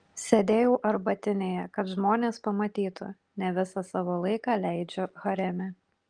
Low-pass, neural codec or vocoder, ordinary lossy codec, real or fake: 9.9 kHz; none; Opus, 24 kbps; real